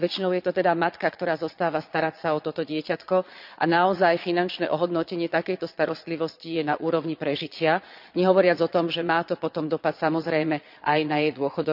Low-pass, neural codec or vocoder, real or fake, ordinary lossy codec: 5.4 kHz; vocoder, 22.05 kHz, 80 mel bands, Vocos; fake; none